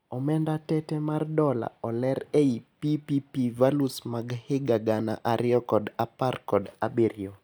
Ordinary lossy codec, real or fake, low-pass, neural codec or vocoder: none; real; none; none